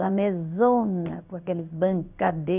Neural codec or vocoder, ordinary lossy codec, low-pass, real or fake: codec, 16 kHz in and 24 kHz out, 1 kbps, XY-Tokenizer; none; 3.6 kHz; fake